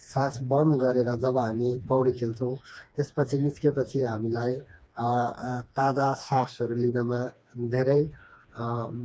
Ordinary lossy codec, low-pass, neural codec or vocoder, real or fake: none; none; codec, 16 kHz, 2 kbps, FreqCodec, smaller model; fake